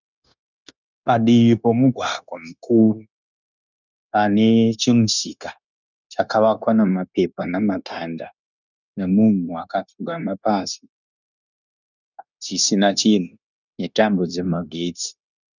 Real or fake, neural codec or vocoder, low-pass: fake; codec, 16 kHz, 0.9 kbps, LongCat-Audio-Codec; 7.2 kHz